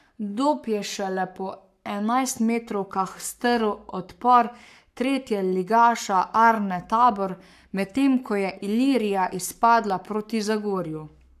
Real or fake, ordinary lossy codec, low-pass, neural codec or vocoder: fake; AAC, 96 kbps; 14.4 kHz; codec, 44.1 kHz, 7.8 kbps, Pupu-Codec